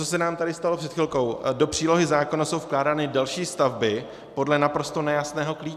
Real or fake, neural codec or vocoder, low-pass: real; none; 14.4 kHz